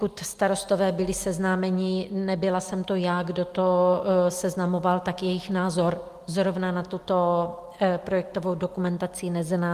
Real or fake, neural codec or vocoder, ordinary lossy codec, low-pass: real; none; Opus, 32 kbps; 14.4 kHz